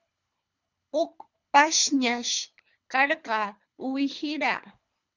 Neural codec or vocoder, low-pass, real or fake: codec, 24 kHz, 3 kbps, HILCodec; 7.2 kHz; fake